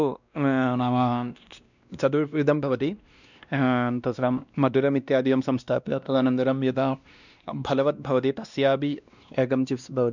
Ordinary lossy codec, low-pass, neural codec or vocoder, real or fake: none; 7.2 kHz; codec, 16 kHz, 1 kbps, X-Codec, WavLM features, trained on Multilingual LibriSpeech; fake